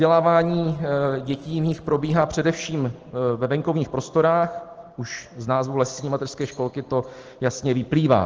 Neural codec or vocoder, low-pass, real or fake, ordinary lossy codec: none; 7.2 kHz; real; Opus, 16 kbps